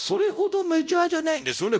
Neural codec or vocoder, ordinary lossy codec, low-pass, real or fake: codec, 16 kHz, 0.5 kbps, X-Codec, WavLM features, trained on Multilingual LibriSpeech; none; none; fake